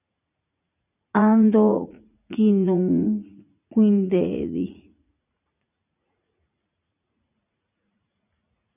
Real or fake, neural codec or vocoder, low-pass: fake; vocoder, 44.1 kHz, 80 mel bands, Vocos; 3.6 kHz